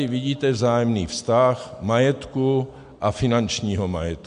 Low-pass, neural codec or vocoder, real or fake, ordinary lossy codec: 9.9 kHz; none; real; MP3, 64 kbps